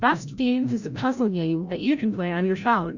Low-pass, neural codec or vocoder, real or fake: 7.2 kHz; codec, 16 kHz, 0.5 kbps, FreqCodec, larger model; fake